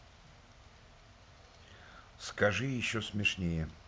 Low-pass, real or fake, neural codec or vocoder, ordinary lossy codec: none; real; none; none